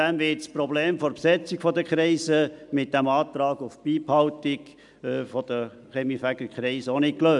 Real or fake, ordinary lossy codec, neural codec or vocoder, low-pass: real; MP3, 96 kbps; none; 10.8 kHz